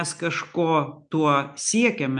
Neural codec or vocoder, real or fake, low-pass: none; real; 9.9 kHz